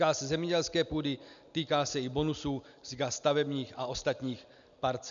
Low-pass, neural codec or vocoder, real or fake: 7.2 kHz; none; real